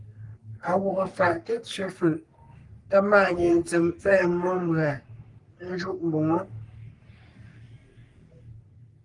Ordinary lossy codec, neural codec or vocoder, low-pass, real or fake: Opus, 32 kbps; codec, 44.1 kHz, 3.4 kbps, Pupu-Codec; 10.8 kHz; fake